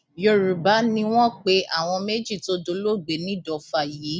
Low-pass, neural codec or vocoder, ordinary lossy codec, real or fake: none; none; none; real